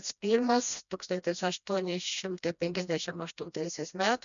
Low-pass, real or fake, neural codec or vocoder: 7.2 kHz; fake; codec, 16 kHz, 1 kbps, FreqCodec, smaller model